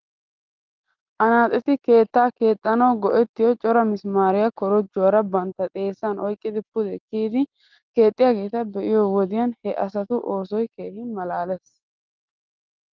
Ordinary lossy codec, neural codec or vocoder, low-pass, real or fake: Opus, 32 kbps; none; 7.2 kHz; real